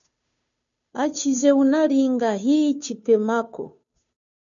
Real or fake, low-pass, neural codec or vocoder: fake; 7.2 kHz; codec, 16 kHz, 2 kbps, FunCodec, trained on Chinese and English, 25 frames a second